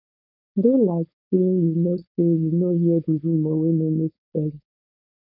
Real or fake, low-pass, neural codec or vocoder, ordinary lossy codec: fake; 5.4 kHz; codec, 16 kHz, 4.8 kbps, FACodec; none